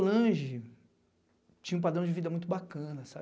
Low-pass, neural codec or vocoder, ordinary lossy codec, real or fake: none; none; none; real